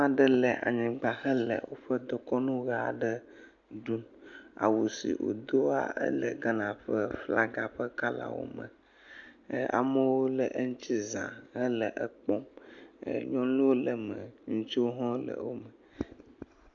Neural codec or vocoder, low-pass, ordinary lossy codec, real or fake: none; 7.2 kHz; AAC, 64 kbps; real